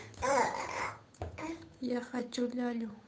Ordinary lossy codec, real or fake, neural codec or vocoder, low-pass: none; fake; codec, 16 kHz, 8 kbps, FunCodec, trained on Chinese and English, 25 frames a second; none